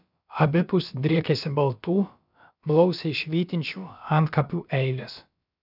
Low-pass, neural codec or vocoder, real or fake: 5.4 kHz; codec, 16 kHz, about 1 kbps, DyCAST, with the encoder's durations; fake